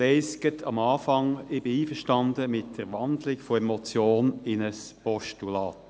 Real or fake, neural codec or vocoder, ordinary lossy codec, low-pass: real; none; none; none